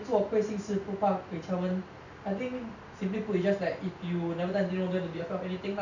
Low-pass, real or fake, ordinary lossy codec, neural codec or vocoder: 7.2 kHz; real; none; none